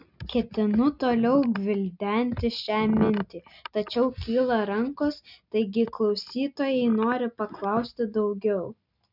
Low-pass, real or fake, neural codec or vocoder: 5.4 kHz; real; none